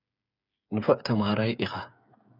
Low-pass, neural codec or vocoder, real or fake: 5.4 kHz; codec, 16 kHz, 8 kbps, FreqCodec, smaller model; fake